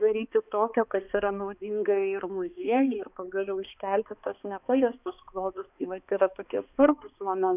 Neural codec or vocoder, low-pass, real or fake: codec, 16 kHz, 2 kbps, X-Codec, HuBERT features, trained on balanced general audio; 3.6 kHz; fake